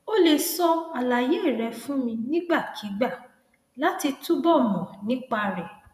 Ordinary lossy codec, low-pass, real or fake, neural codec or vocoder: MP3, 96 kbps; 14.4 kHz; fake; vocoder, 48 kHz, 128 mel bands, Vocos